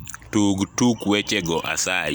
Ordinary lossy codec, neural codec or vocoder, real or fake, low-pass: none; none; real; none